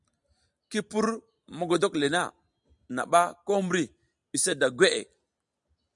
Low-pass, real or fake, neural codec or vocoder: 10.8 kHz; real; none